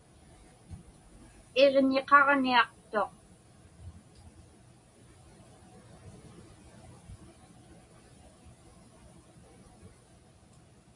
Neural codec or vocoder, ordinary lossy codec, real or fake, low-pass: none; MP3, 48 kbps; real; 10.8 kHz